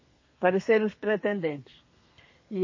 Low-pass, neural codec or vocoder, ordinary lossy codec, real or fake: 7.2 kHz; codec, 32 kHz, 1.9 kbps, SNAC; MP3, 32 kbps; fake